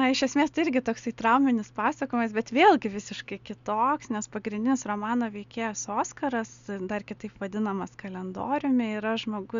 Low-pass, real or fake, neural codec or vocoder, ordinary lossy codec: 7.2 kHz; real; none; Opus, 64 kbps